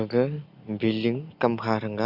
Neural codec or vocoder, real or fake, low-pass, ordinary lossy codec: none; real; 5.4 kHz; Opus, 64 kbps